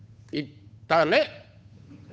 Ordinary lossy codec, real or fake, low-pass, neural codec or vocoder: none; fake; none; codec, 16 kHz, 8 kbps, FunCodec, trained on Chinese and English, 25 frames a second